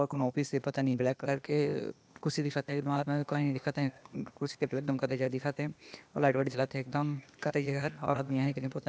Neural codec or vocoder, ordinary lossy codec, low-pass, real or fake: codec, 16 kHz, 0.8 kbps, ZipCodec; none; none; fake